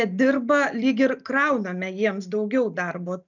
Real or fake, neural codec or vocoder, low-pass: real; none; 7.2 kHz